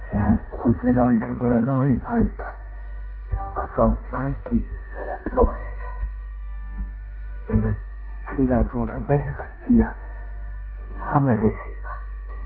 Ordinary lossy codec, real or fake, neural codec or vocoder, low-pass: none; fake; codec, 16 kHz in and 24 kHz out, 0.9 kbps, LongCat-Audio-Codec, four codebook decoder; 5.4 kHz